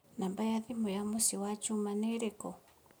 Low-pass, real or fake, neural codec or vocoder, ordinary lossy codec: none; real; none; none